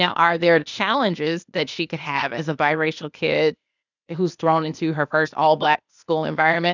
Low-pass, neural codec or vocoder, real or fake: 7.2 kHz; codec, 16 kHz, 0.8 kbps, ZipCodec; fake